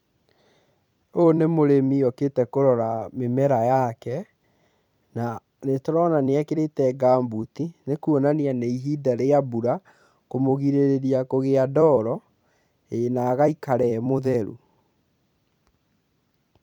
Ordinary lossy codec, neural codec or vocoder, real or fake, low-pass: none; vocoder, 44.1 kHz, 128 mel bands every 256 samples, BigVGAN v2; fake; 19.8 kHz